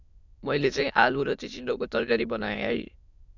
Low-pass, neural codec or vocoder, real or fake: 7.2 kHz; autoencoder, 22.05 kHz, a latent of 192 numbers a frame, VITS, trained on many speakers; fake